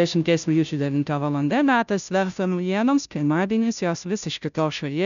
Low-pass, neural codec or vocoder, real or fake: 7.2 kHz; codec, 16 kHz, 0.5 kbps, FunCodec, trained on Chinese and English, 25 frames a second; fake